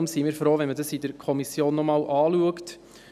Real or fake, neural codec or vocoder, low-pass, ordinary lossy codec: real; none; 14.4 kHz; none